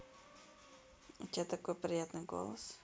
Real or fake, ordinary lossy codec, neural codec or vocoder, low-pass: real; none; none; none